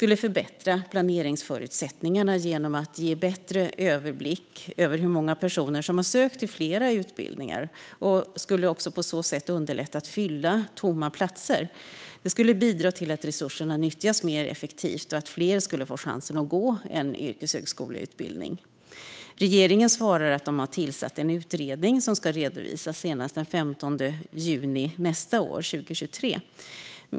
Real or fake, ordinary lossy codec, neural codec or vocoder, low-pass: fake; none; codec, 16 kHz, 8 kbps, FunCodec, trained on Chinese and English, 25 frames a second; none